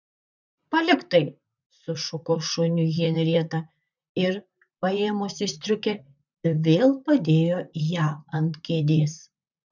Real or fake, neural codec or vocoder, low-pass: fake; vocoder, 44.1 kHz, 128 mel bands, Pupu-Vocoder; 7.2 kHz